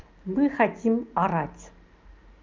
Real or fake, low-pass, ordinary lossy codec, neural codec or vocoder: real; 7.2 kHz; Opus, 32 kbps; none